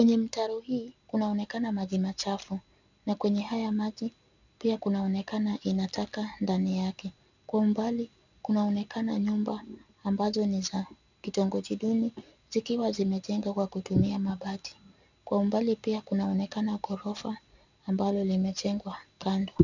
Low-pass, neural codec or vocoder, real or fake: 7.2 kHz; none; real